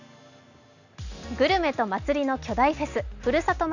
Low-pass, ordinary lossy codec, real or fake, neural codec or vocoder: 7.2 kHz; none; real; none